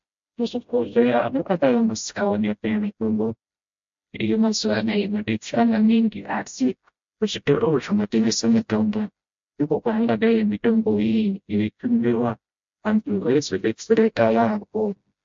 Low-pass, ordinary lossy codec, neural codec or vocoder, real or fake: 7.2 kHz; MP3, 48 kbps; codec, 16 kHz, 0.5 kbps, FreqCodec, smaller model; fake